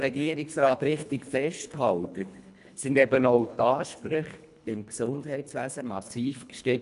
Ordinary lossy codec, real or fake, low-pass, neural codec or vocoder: none; fake; 10.8 kHz; codec, 24 kHz, 1.5 kbps, HILCodec